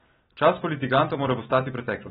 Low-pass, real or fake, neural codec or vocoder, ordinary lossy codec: 7.2 kHz; real; none; AAC, 16 kbps